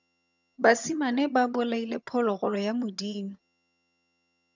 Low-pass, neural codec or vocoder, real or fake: 7.2 kHz; vocoder, 22.05 kHz, 80 mel bands, HiFi-GAN; fake